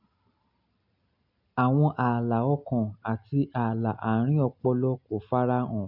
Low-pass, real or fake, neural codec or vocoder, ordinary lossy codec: 5.4 kHz; real; none; none